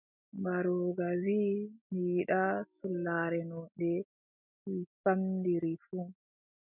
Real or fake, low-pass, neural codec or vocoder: real; 3.6 kHz; none